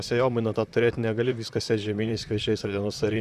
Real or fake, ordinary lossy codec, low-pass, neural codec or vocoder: fake; Opus, 64 kbps; 14.4 kHz; vocoder, 44.1 kHz, 128 mel bands, Pupu-Vocoder